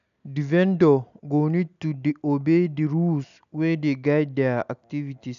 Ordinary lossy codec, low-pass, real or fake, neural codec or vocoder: none; 7.2 kHz; real; none